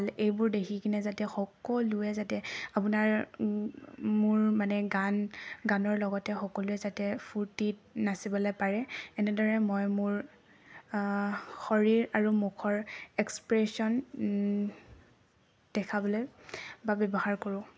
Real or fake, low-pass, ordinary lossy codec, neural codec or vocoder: real; none; none; none